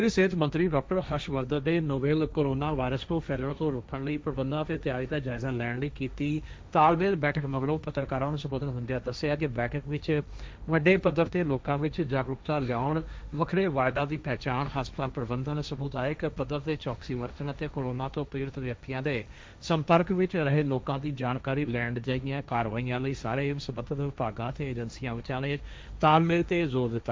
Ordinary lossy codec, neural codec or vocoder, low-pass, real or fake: none; codec, 16 kHz, 1.1 kbps, Voila-Tokenizer; none; fake